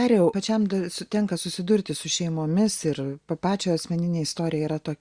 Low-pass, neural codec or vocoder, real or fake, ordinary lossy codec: 9.9 kHz; none; real; Opus, 64 kbps